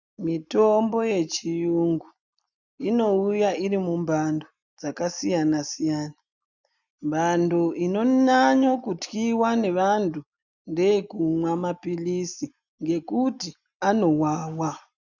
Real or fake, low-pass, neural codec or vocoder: real; 7.2 kHz; none